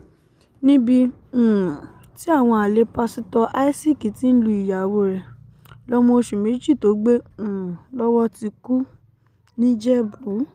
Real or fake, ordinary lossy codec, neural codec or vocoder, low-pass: real; Opus, 32 kbps; none; 14.4 kHz